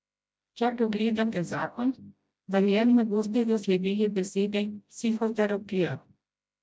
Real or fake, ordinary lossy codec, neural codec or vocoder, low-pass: fake; none; codec, 16 kHz, 0.5 kbps, FreqCodec, smaller model; none